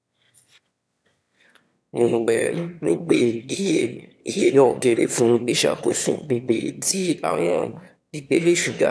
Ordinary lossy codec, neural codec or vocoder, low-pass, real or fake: none; autoencoder, 22.05 kHz, a latent of 192 numbers a frame, VITS, trained on one speaker; none; fake